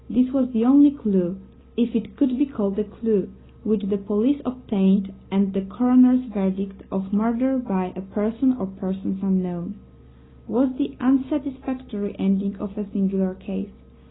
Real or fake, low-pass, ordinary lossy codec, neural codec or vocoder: real; 7.2 kHz; AAC, 16 kbps; none